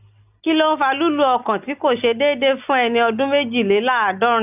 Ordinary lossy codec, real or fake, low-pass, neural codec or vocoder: none; real; 3.6 kHz; none